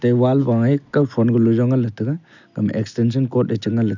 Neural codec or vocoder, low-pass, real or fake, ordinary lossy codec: none; 7.2 kHz; real; none